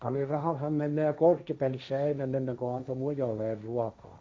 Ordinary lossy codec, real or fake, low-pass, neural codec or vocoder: none; fake; none; codec, 16 kHz, 1.1 kbps, Voila-Tokenizer